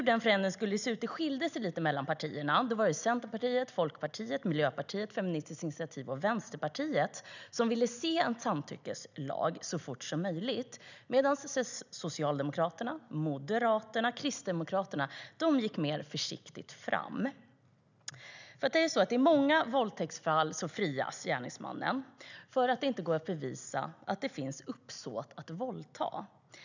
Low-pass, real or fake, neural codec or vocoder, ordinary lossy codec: 7.2 kHz; real; none; none